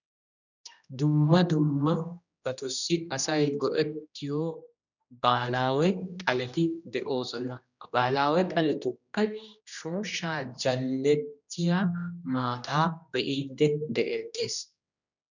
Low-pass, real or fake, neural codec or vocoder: 7.2 kHz; fake; codec, 16 kHz, 1 kbps, X-Codec, HuBERT features, trained on general audio